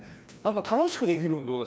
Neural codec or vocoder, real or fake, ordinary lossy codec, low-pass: codec, 16 kHz, 1 kbps, FreqCodec, larger model; fake; none; none